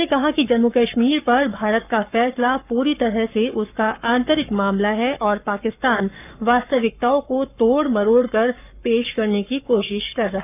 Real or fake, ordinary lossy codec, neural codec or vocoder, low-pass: fake; none; codec, 44.1 kHz, 7.8 kbps, Pupu-Codec; 3.6 kHz